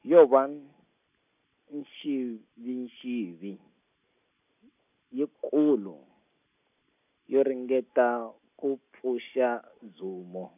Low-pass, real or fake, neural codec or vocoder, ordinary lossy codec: 3.6 kHz; real; none; none